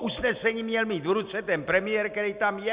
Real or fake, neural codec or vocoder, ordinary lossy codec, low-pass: real; none; Opus, 64 kbps; 3.6 kHz